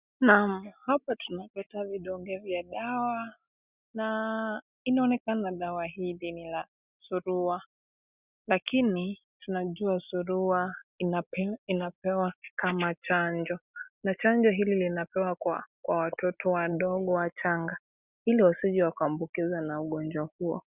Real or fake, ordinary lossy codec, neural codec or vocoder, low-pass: real; Opus, 64 kbps; none; 3.6 kHz